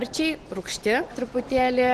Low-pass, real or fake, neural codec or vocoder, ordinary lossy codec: 14.4 kHz; real; none; Opus, 16 kbps